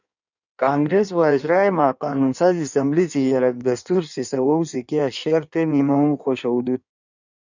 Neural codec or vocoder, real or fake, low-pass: codec, 16 kHz in and 24 kHz out, 1.1 kbps, FireRedTTS-2 codec; fake; 7.2 kHz